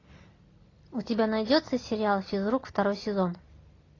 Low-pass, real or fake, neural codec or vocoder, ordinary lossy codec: 7.2 kHz; real; none; AAC, 32 kbps